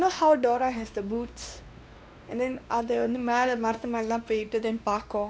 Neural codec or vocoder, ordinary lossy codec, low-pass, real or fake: codec, 16 kHz, 2 kbps, X-Codec, WavLM features, trained on Multilingual LibriSpeech; none; none; fake